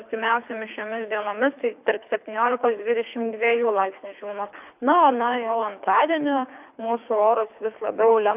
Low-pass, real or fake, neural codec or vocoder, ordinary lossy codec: 3.6 kHz; fake; codec, 24 kHz, 3 kbps, HILCodec; AAC, 32 kbps